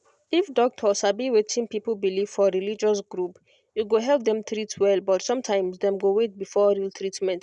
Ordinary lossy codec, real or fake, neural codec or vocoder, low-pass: none; real; none; 10.8 kHz